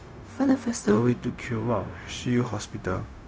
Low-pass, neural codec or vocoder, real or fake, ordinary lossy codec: none; codec, 16 kHz, 0.4 kbps, LongCat-Audio-Codec; fake; none